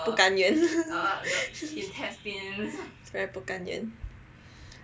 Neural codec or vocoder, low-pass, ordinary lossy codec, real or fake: none; none; none; real